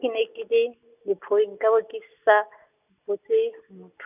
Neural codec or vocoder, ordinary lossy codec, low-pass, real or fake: none; none; 3.6 kHz; real